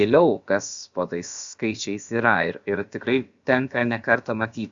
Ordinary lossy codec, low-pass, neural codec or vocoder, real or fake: Opus, 64 kbps; 7.2 kHz; codec, 16 kHz, about 1 kbps, DyCAST, with the encoder's durations; fake